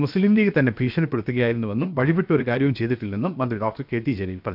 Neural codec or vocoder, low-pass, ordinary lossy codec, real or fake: codec, 16 kHz, 0.7 kbps, FocalCodec; 5.4 kHz; none; fake